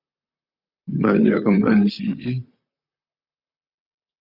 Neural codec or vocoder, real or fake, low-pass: vocoder, 44.1 kHz, 128 mel bands, Pupu-Vocoder; fake; 5.4 kHz